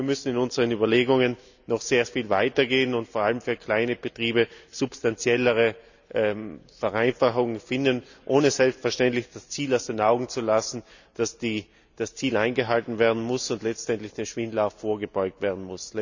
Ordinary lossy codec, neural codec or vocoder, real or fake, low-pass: none; none; real; 7.2 kHz